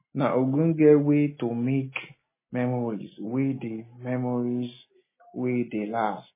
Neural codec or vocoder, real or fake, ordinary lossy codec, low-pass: none; real; MP3, 16 kbps; 3.6 kHz